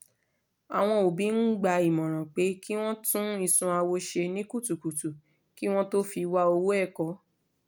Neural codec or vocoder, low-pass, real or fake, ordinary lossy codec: none; none; real; none